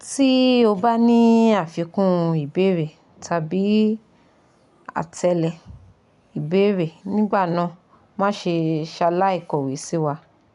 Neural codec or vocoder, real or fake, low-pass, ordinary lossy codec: none; real; 10.8 kHz; none